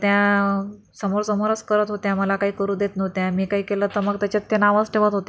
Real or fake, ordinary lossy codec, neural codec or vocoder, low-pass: real; none; none; none